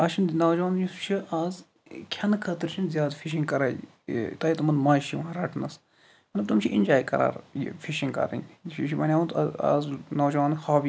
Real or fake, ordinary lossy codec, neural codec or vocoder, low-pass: real; none; none; none